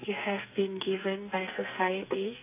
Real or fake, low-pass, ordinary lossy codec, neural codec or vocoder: fake; 3.6 kHz; AAC, 24 kbps; codec, 44.1 kHz, 2.6 kbps, SNAC